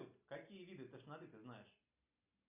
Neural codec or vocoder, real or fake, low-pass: none; real; 3.6 kHz